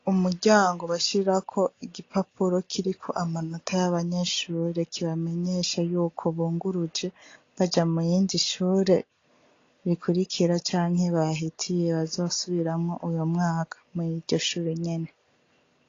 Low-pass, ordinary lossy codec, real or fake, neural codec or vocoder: 7.2 kHz; AAC, 32 kbps; real; none